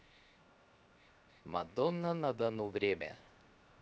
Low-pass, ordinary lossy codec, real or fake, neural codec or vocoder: none; none; fake; codec, 16 kHz, 0.7 kbps, FocalCodec